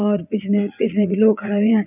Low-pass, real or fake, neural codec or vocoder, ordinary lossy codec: 3.6 kHz; fake; vocoder, 44.1 kHz, 80 mel bands, Vocos; none